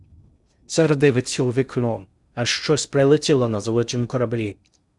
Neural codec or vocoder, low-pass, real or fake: codec, 16 kHz in and 24 kHz out, 0.6 kbps, FocalCodec, streaming, 2048 codes; 10.8 kHz; fake